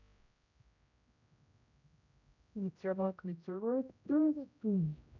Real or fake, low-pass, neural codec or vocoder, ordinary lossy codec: fake; 7.2 kHz; codec, 16 kHz, 0.5 kbps, X-Codec, HuBERT features, trained on general audio; none